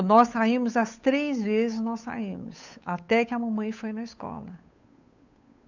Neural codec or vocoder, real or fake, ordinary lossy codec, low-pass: codec, 16 kHz, 8 kbps, FunCodec, trained on Chinese and English, 25 frames a second; fake; none; 7.2 kHz